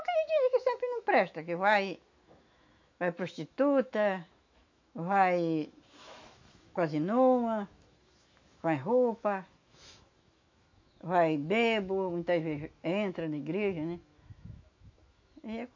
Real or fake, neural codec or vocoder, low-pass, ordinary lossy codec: fake; autoencoder, 48 kHz, 128 numbers a frame, DAC-VAE, trained on Japanese speech; 7.2 kHz; MP3, 48 kbps